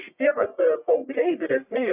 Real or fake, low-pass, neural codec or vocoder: fake; 3.6 kHz; codec, 44.1 kHz, 1.7 kbps, Pupu-Codec